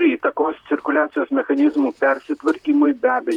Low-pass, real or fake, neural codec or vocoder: 19.8 kHz; fake; vocoder, 44.1 kHz, 128 mel bands, Pupu-Vocoder